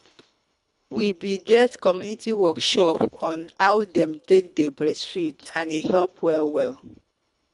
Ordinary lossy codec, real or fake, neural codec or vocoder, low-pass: none; fake; codec, 24 kHz, 1.5 kbps, HILCodec; 10.8 kHz